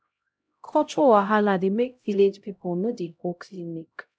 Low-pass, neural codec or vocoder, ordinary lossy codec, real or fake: none; codec, 16 kHz, 0.5 kbps, X-Codec, HuBERT features, trained on LibriSpeech; none; fake